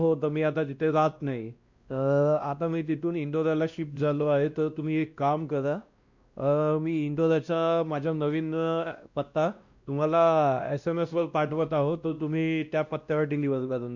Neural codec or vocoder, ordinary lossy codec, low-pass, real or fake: codec, 16 kHz, 1 kbps, X-Codec, WavLM features, trained on Multilingual LibriSpeech; Opus, 64 kbps; 7.2 kHz; fake